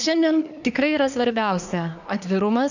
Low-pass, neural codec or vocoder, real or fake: 7.2 kHz; codec, 24 kHz, 1 kbps, SNAC; fake